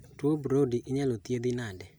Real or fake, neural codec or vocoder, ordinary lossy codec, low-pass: real; none; none; none